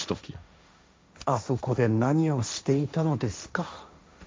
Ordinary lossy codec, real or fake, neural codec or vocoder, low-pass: none; fake; codec, 16 kHz, 1.1 kbps, Voila-Tokenizer; none